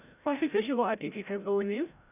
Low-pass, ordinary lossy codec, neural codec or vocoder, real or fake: 3.6 kHz; none; codec, 16 kHz, 0.5 kbps, FreqCodec, larger model; fake